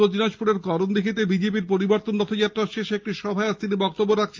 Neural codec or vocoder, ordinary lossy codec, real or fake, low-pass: none; Opus, 24 kbps; real; 7.2 kHz